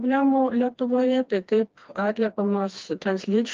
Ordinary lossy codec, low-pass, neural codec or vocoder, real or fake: Opus, 24 kbps; 7.2 kHz; codec, 16 kHz, 2 kbps, FreqCodec, smaller model; fake